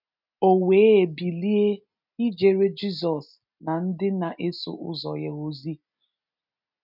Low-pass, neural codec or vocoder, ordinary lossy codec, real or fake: 5.4 kHz; none; none; real